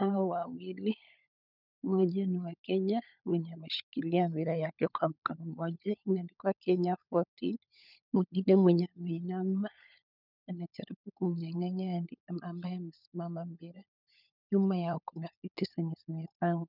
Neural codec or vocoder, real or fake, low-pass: codec, 16 kHz, 16 kbps, FunCodec, trained on LibriTTS, 50 frames a second; fake; 5.4 kHz